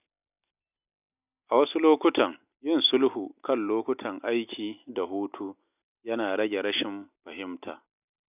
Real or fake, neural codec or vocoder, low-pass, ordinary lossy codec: real; none; 3.6 kHz; none